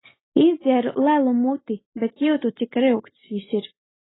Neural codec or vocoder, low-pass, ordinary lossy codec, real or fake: none; 7.2 kHz; AAC, 16 kbps; real